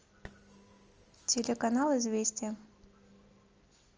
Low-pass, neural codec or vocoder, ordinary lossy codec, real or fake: 7.2 kHz; none; Opus, 24 kbps; real